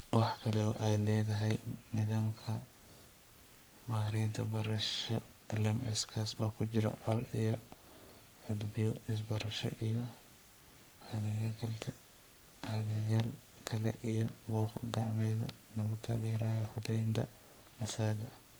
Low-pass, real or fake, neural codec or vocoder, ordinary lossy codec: none; fake; codec, 44.1 kHz, 3.4 kbps, Pupu-Codec; none